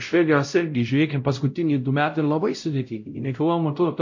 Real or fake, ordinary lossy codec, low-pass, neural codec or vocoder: fake; MP3, 48 kbps; 7.2 kHz; codec, 16 kHz, 0.5 kbps, X-Codec, WavLM features, trained on Multilingual LibriSpeech